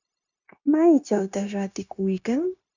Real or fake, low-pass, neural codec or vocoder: fake; 7.2 kHz; codec, 16 kHz, 0.9 kbps, LongCat-Audio-Codec